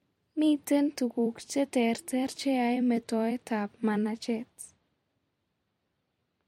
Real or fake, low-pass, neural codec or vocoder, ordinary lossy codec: fake; 19.8 kHz; vocoder, 44.1 kHz, 128 mel bands every 256 samples, BigVGAN v2; MP3, 64 kbps